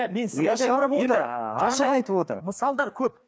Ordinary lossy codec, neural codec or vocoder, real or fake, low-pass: none; codec, 16 kHz, 2 kbps, FreqCodec, larger model; fake; none